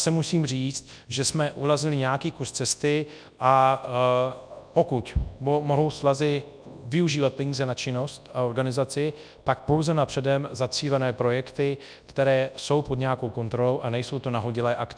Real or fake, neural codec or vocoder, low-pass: fake; codec, 24 kHz, 0.9 kbps, WavTokenizer, large speech release; 9.9 kHz